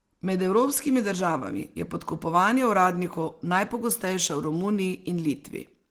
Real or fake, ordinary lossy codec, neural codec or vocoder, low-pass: real; Opus, 16 kbps; none; 19.8 kHz